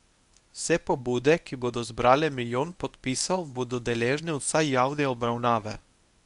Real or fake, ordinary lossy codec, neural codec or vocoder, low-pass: fake; none; codec, 24 kHz, 0.9 kbps, WavTokenizer, medium speech release version 1; 10.8 kHz